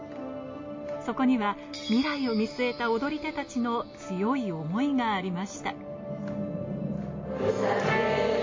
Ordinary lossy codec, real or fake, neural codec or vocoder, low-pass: MP3, 32 kbps; real; none; 7.2 kHz